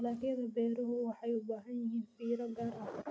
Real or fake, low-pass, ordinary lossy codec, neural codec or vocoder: real; none; none; none